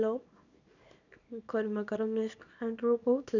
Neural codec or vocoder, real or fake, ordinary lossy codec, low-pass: codec, 24 kHz, 0.9 kbps, WavTokenizer, small release; fake; none; 7.2 kHz